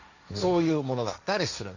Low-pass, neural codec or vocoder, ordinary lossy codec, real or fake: 7.2 kHz; codec, 16 kHz, 1.1 kbps, Voila-Tokenizer; none; fake